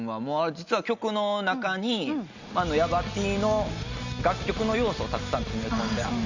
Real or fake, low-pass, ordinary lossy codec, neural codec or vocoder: real; 7.2 kHz; Opus, 64 kbps; none